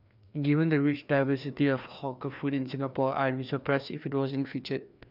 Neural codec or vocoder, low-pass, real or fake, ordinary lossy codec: codec, 16 kHz, 2 kbps, FreqCodec, larger model; 5.4 kHz; fake; none